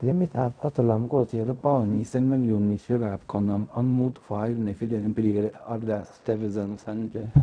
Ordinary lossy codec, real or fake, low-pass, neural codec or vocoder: none; fake; 9.9 kHz; codec, 16 kHz in and 24 kHz out, 0.4 kbps, LongCat-Audio-Codec, fine tuned four codebook decoder